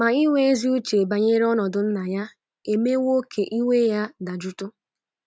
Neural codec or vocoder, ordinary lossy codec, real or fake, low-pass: none; none; real; none